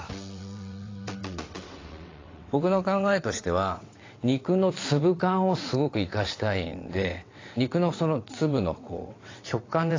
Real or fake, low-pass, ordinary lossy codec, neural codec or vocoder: fake; 7.2 kHz; AAC, 32 kbps; vocoder, 22.05 kHz, 80 mel bands, WaveNeXt